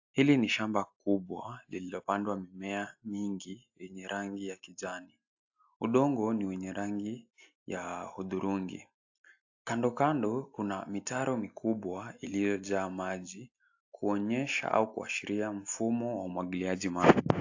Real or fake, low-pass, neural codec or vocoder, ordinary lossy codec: real; 7.2 kHz; none; AAC, 48 kbps